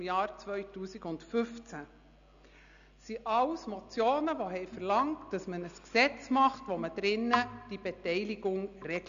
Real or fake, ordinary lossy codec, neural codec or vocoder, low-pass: real; none; none; 7.2 kHz